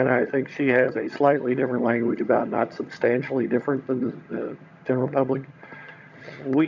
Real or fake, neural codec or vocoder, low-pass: fake; vocoder, 22.05 kHz, 80 mel bands, HiFi-GAN; 7.2 kHz